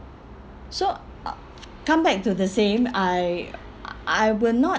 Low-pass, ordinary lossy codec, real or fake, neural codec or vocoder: none; none; real; none